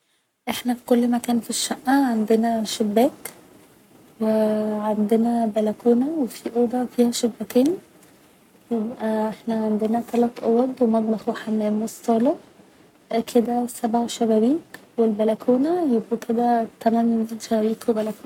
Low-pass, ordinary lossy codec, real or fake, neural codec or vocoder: 19.8 kHz; none; fake; codec, 44.1 kHz, 7.8 kbps, Pupu-Codec